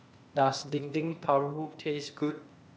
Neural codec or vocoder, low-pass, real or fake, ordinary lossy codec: codec, 16 kHz, 0.8 kbps, ZipCodec; none; fake; none